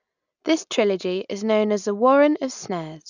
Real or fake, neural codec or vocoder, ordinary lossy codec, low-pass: real; none; none; 7.2 kHz